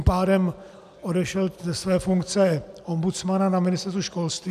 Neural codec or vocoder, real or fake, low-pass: none; real; 14.4 kHz